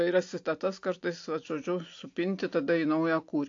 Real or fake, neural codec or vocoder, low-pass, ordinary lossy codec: real; none; 7.2 kHz; AAC, 48 kbps